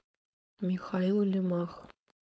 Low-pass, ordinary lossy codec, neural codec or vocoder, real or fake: none; none; codec, 16 kHz, 4.8 kbps, FACodec; fake